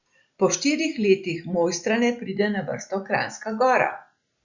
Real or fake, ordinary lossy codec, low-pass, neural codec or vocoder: real; Opus, 64 kbps; 7.2 kHz; none